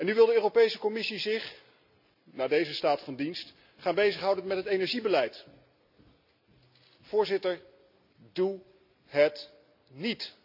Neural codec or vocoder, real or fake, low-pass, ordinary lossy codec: none; real; 5.4 kHz; none